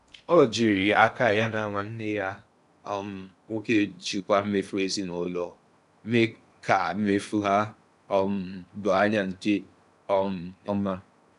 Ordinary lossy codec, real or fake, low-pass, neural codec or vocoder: none; fake; 10.8 kHz; codec, 16 kHz in and 24 kHz out, 0.8 kbps, FocalCodec, streaming, 65536 codes